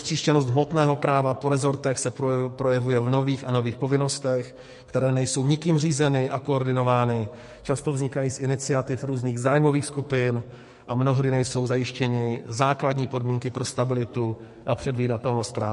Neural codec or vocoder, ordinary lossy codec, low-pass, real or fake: codec, 44.1 kHz, 2.6 kbps, SNAC; MP3, 48 kbps; 14.4 kHz; fake